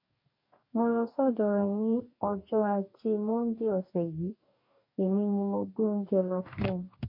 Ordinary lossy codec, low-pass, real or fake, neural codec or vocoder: MP3, 32 kbps; 5.4 kHz; fake; codec, 44.1 kHz, 2.6 kbps, DAC